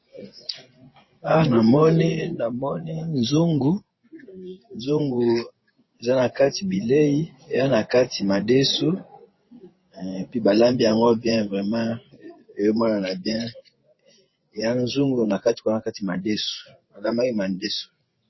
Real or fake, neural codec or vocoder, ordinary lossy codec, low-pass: fake; vocoder, 24 kHz, 100 mel bands, Vocos; MP3, 24 kbps; 7.2 kHz